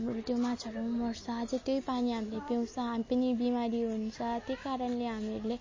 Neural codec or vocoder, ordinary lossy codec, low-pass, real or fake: none; MP3, 32 kbps; 7.2 kHz; real